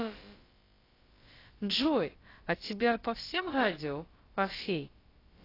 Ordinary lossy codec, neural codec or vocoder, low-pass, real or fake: AAC, 24 kbps; codec, 16 kHz, about 1 kbps, DyCAST, with the encoder's durations; 5.4 kHz; fake